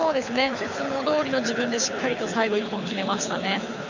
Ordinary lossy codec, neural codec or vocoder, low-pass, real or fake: none; codec, 24 kHz, 6 kbps, HILCodec; 7.2 kHz; fake